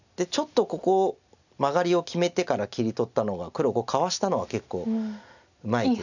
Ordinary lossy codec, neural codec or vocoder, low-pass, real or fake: none; none; 7.2 kHz; real